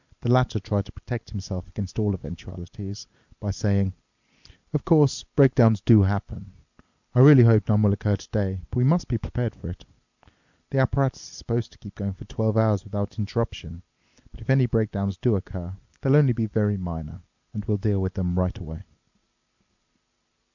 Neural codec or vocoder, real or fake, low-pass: none; real; 7.2 kHz